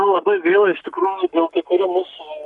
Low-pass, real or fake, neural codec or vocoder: 10.8 kHz; fake; codec, 44.1 kHz, 7.8 kbps, Pupu-Codec